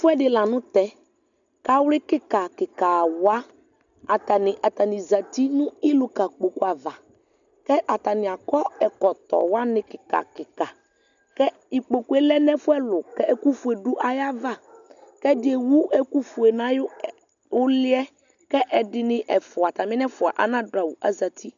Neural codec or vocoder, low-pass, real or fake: none; 7.2 kHz; real